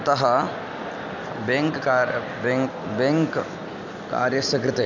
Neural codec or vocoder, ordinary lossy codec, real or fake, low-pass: none; none; real; 7.2 kHz